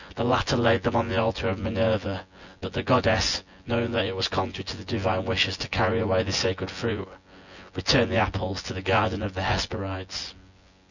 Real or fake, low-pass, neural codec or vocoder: fake; 7.2 kHz; vocoder, 24 kHz, 100 mel bands, Vocos